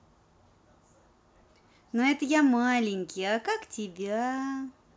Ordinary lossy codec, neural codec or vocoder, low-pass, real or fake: none; none; none; real